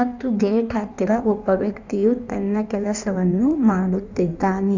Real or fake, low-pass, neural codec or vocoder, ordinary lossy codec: fake; 7.2 kHz; codec, 16 kHz in and 24 kHz out, 1.1 kbps, FireRedTTS-2 codec; none